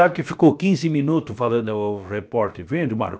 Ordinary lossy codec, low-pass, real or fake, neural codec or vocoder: none; none; fake; codec, 16 kHz, about 1 kbps, DyCAST, with the encoder's durations